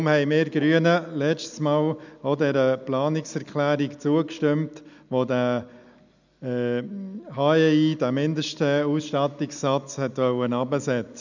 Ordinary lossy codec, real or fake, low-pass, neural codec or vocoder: none; real; 7.2 kHz; none